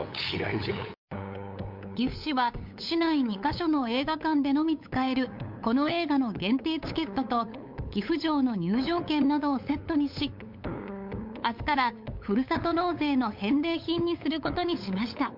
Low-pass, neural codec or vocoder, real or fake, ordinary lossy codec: 5.4 kHz; codec, 16 kHz, 8 kbps, FunCodec, trained on LibriTTS, 25 frames a second; fake; MP3, 48 kbps